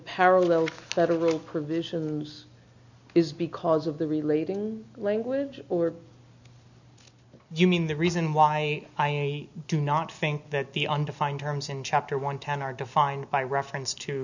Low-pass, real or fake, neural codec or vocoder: 7.2 kHz; real; none